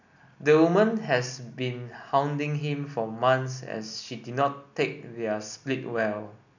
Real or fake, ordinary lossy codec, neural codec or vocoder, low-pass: real; none; none; 7.2 kHz